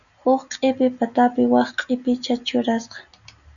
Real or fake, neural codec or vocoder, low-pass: real; none; 7.2 kHz